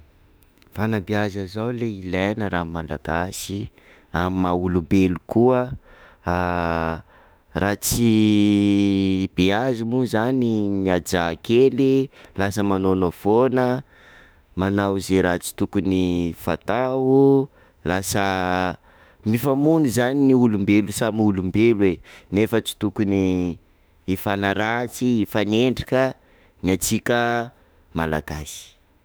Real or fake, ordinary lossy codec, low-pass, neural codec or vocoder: fake; none; none; autoencoder, 48 kHz, 32 numbers a frame, DAC-VAE, trained on Japanese speech